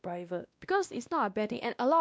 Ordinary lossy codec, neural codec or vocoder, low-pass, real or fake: none; codec, 16 kHz, 1 kbps, X-Codec, WavLM features, trained on Multilingual LibriSpeech; none; fake